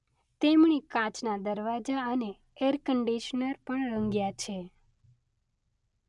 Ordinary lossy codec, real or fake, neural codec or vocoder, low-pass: none; real; none; 10.8 kHz